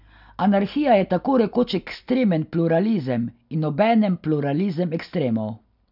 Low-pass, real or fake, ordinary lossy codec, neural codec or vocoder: 5.4 kHz; real; none; none